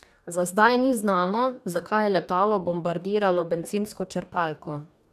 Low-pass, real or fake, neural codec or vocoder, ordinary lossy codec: 14.4 kHz; fake; codec, 44.1 kHz, 2.6 kbps, DAC; none